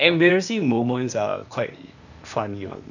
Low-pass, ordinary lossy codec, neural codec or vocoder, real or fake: 7.2 kHz; none; codec, 16 kHz, 0.8 kbps, ZipCodec; fake